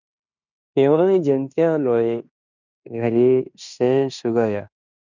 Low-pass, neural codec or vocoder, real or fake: 7.2 kHz; codec, 16 kHz in and 24 kHz out, 0.9 kbps, LongCat-Audio-Codec, fine tuned four codebook decoder; fake